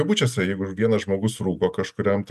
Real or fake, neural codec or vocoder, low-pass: real; none; 14.4 kHz